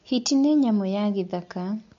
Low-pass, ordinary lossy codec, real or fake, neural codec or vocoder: 7.2 kHz; MP3, 48 kbps; real; none